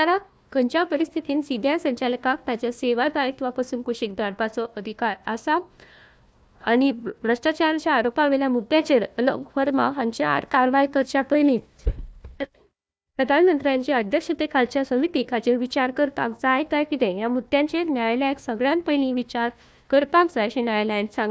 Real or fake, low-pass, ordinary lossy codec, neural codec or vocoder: fake; none; none; codec, 16 kHz, 1 kbps, FunCodec, trained on Chinese and English, 50 frames a second